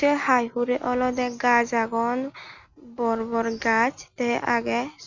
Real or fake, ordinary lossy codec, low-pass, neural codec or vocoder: real; Opus, 64 kbps; 7.2 kHz; none